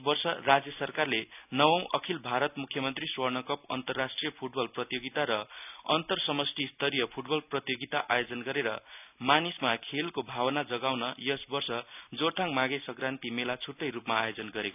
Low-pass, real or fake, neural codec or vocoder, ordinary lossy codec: 3.6 kHz; real; none; none